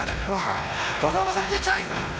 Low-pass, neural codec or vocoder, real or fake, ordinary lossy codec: none; codec, 16 kHz, 1 kbps, X-Codec, WavLM features, trained on Multilingual LibriSpeech; fake; none